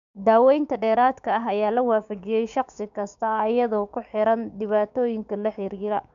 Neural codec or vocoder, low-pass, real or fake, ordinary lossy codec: codec, 16 kHz, 6 kbps, DAC; 7.2 kHz; fake; none